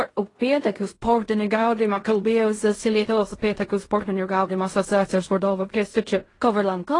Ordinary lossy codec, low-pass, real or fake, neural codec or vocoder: AAC, 32 kbps; 10.8 kHz; fake; codec, 16 kHz in and 24 kHz out, 0.4 kbps, LongCat-Audio-Codec, fine tuned four codebook decoder